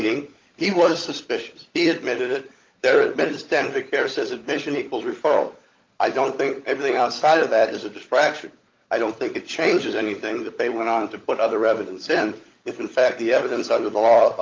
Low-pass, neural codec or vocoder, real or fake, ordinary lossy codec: 7.2 kHz; codec, 16 kHz, 16 kbps, FunCodec, trained on LibriTTS, 50 frames a second; fake; Opus, 32 kbps